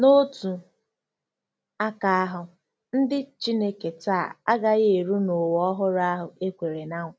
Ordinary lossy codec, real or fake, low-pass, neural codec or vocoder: none; real; none; none